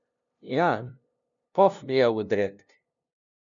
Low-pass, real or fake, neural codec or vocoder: 7.2 kHz; fake; codec, 16 kHz, 0.5 kbps, FunCodec, trained on LibriTTS, 25 frames a second